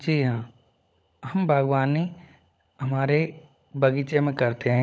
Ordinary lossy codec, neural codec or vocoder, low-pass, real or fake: none; codec, 16 kHz, 16 kbps, FunCodec, trained on LibriTTS, 50 frames a second; none; fake